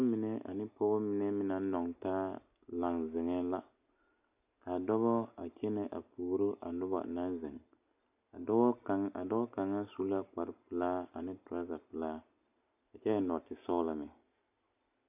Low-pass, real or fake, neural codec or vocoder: 3.6 kHz; real; none